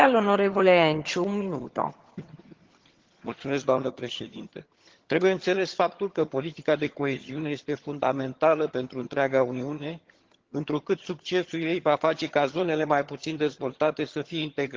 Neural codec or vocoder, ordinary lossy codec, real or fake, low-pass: vocoder, 22.05 kHz, 80 mel bands, HiFi-GAN; Opus, 16 kbps; fake; 7.2 kHz